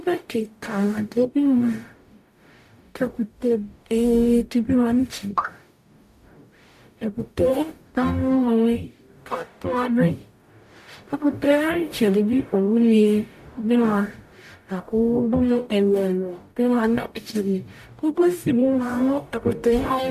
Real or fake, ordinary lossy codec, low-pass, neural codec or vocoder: fake; AAC, 96 kbps; 14.4 kHz; codec, 44.1 kHz, 0.9 kbps, DAC